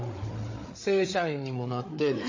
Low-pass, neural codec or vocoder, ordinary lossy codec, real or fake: 7.2 kHz; codec, 16 kHz, 8 kbps, FreqCodec, larger model; MP3, 32 kbps; fake